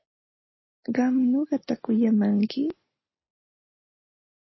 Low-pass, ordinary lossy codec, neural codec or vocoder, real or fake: 7.2 kHz; MP3, 24 kbps; none; real